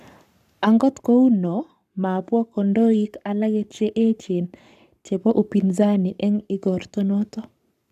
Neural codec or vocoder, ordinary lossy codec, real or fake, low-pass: codec, 44.1 kHz, 7.8 kbps, Pupu-Codec; none; fake; 14.4 kHz